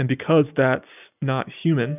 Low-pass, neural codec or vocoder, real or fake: 3.6 kHz; none; real